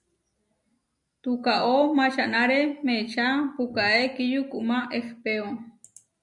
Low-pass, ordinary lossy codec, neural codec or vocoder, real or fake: 10.8 kHz; MP3, 96 kbps; none; real